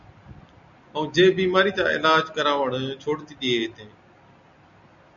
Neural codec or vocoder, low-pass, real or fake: none; 7.2 kHz; real